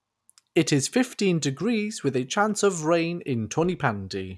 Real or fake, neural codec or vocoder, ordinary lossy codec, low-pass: real; none; none; none